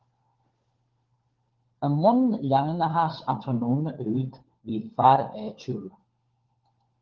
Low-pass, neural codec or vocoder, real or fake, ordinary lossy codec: 7.2 kHz; codec, 16 kHz, 4 kbps, FunCodec, trained on LibriTTS, 50 frames a second; fake; Opus, 16 kbps